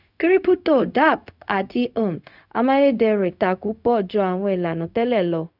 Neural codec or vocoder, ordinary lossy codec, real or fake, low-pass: codec, 16 kHz, 0.4 kbps, LongCat-Audio-Codec; none; fake; 5.4 kHz